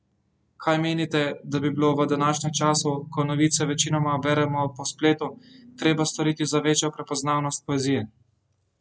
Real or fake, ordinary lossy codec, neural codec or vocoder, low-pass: real; none; none; none